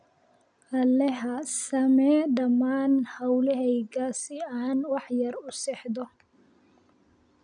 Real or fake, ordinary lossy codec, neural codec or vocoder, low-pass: real; none; none; 9.9 kHz